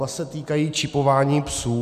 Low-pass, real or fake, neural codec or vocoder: 14.4 kHz; real; none